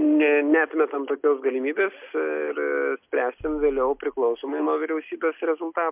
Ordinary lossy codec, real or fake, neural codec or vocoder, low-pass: MP3, 32 kbps; real; none; 3.6 kHz